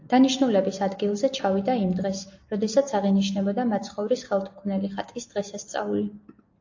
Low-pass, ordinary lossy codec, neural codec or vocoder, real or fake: 7.2 kHz; AAC, 48 kbps; none; real